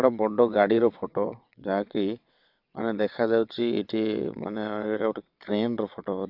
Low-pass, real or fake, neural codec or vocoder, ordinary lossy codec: 5.4 kHz; fake; vocoder, 22.05 kHz, 80 mel bands, WaveNeXt; AAC, 48 kbps